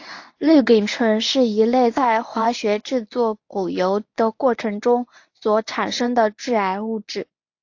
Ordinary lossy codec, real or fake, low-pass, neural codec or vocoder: AAC, 48 kbps; fake; 7.2 kHz; codec, 24 kHz, 0.9 kbps, WavTokenizer, medium speech release version 1